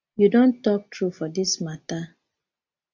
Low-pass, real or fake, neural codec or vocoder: 7.2 kHz; real; none